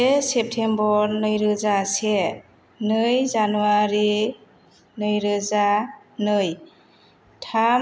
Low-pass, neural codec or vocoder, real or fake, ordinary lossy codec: none; none; real; none